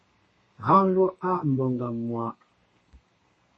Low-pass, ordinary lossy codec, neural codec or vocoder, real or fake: 9.9 kHz; MP3, 32 kbps; codec, 32 kHz, 1.9 kbps, SNAC; fake